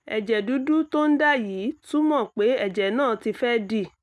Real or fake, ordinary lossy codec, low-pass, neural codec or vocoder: real; none; none; none